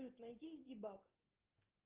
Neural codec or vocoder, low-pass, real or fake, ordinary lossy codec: none; 3.6 kHz; real; Opus, 16 kbps